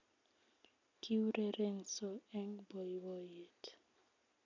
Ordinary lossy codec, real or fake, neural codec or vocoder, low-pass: none; real; none; 7.2 kHz